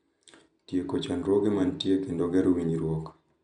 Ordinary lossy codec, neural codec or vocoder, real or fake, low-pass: none; none; real; 9.9 kHz